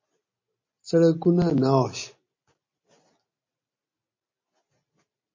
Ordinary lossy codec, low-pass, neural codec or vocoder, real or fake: MP3, 32 kbps; 7.2 kHz; none; real